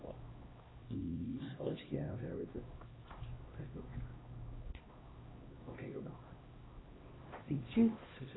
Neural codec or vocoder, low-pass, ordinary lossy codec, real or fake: codec, 16 kHz, 1 kbps, X-Codec, HuBERT features, trained on LibriSpeech; 7.2 kHz; AAC, 16 kbps; fake